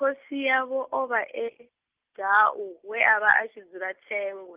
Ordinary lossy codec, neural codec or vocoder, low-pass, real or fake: Opus, 32 kbps; none; 3.6 kHz; real